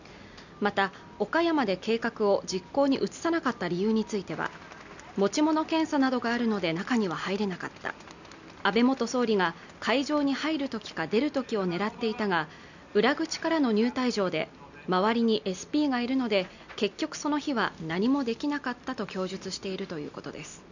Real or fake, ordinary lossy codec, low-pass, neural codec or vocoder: real; none; 7.2 kHz; none